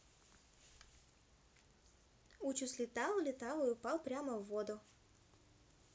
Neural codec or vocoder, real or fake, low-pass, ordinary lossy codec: none; real; none; none